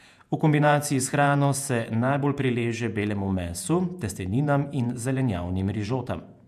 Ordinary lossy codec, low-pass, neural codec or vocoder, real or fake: MP3, 96 kbps; 14.4 kHz; vocoder, 48 kHz, 128 mel bands, Vocos; fake